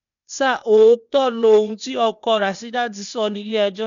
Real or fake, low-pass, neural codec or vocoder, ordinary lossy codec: fake; 7.2 kHz; codec, 16 kHz, 0.8 kbps, ZipCodec; none